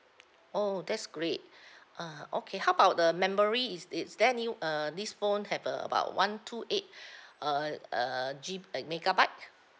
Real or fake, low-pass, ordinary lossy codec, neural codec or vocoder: real; none; none; none